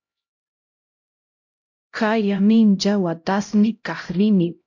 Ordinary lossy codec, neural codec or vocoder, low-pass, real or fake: MP3, 48 kbps; codec, 16 kHz, 0.5 kbps, X-Codec, HuBERT features, trained on LibriSpeech; 7.2 kHz; fake